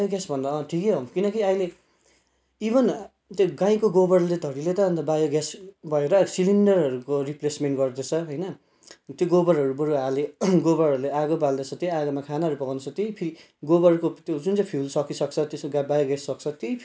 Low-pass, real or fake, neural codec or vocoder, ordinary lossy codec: none; real; none; none